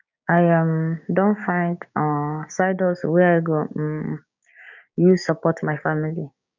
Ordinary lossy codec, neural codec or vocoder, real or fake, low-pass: none; codec, 16 kHz, 6 kbps, DAC; fake; 7.2 kHz